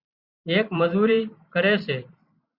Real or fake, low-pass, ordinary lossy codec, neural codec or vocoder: fake; 5.4 kHz; Opus, 64 kbps; vocoder, 44.1 kHz, 128 mel bands every 256 samples, BigVGAN v2